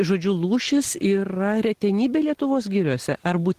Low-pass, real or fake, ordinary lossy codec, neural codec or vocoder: 14.4 kHz; real; Opus, 16 kbps; none